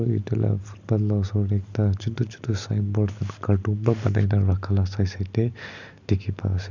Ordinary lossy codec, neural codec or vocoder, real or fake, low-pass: none; none; real; 7.2 kHz